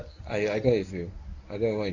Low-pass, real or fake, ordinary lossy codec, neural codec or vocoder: 7.2 kHz; fake; none; codec, 24 kHz, 0.9 kbps, WavTokenizer, medium speech release version 1